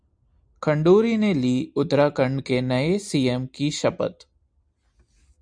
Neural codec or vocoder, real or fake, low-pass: none; real; 9.9 kHz